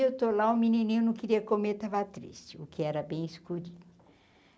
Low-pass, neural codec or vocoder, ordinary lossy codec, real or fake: none; none; none; real